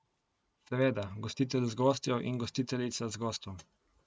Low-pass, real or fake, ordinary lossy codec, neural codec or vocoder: none; real; none; none